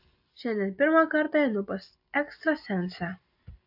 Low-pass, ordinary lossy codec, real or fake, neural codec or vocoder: 5.4 kHz; AAC, 48 kbps; real; none